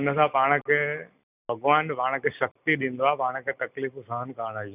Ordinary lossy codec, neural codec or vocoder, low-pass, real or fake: none; none; 3.6 kHz; real